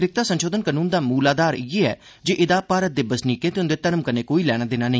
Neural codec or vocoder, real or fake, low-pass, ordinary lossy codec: none; real; none; none